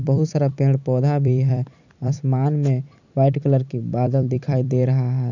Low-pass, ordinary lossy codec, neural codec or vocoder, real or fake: 7.2 kHz; none; vocoder, 44.1 kHz, 128 mel bands every 256 samples, BigVGAN v2; fake